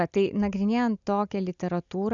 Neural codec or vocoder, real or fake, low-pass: none; real; 7.2 kHz